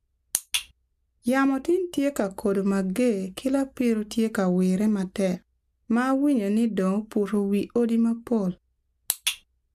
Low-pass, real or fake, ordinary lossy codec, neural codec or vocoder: 14.4 kHz; real; AAC, 96 kbps; none